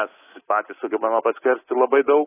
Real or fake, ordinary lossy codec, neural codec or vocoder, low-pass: fake; AAC, 16 kbps; codec, 24 kHz, 3.1 kbps, DualCodec; 3.6 kHz